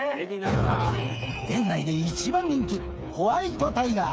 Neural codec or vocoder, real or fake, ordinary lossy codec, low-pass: codec, 16 kHz, 4 kbps, FreqCodec, smaller model; fake; none; none